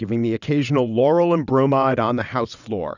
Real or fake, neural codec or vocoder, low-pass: fake; vocoder, 22.05 kHz, 80 mel bands, WaveNeXt; 7.2 kHz